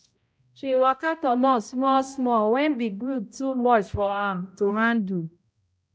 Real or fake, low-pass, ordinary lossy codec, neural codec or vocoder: fake; none; none; codec, 16 kHz, 0.5 kbps, X-Codec, HuBERT features, trained on balanced general audio